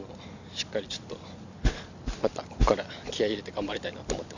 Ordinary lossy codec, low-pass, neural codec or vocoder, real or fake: none; 7.2 kHz; none; real